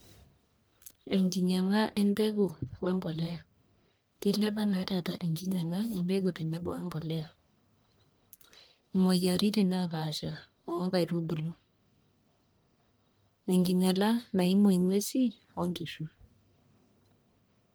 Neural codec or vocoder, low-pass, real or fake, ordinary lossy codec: codec, 44.1 kHz, 1.7 kbps, Pupu-Codec; none; fake; none